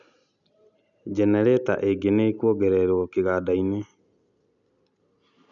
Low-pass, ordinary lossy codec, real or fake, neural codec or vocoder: 7.2 kHz; none; real; none